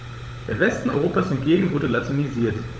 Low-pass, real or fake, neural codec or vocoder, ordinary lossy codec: none; fake; codec, 16 kHz, 16 kbps, FunCodec, trained on LibriTTS, 50 frames a second; none